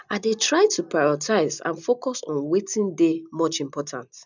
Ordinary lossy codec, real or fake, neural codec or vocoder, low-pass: none; real; none; 7.2 kHz